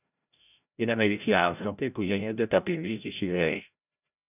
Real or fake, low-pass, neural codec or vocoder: fake; 3.6 kHz; codec, 16 kHz, 0.5 kbps, FreqCodec, larger model